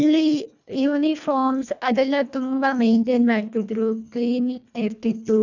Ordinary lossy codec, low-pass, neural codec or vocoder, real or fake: none; 7.2 kHz; codec, 24 kHz, 1.5 kbps, HILCodec; fake